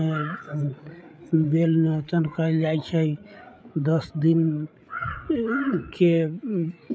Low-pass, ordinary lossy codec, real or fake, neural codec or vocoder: none; none; fake; codec, 16 kHz, 8 kbps, FreqCodec, larger model